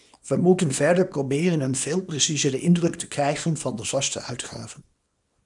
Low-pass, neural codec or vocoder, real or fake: 10.8 kHz; codec, 24 kHz, 0.9 kbps, WavTokenizer, small release; fake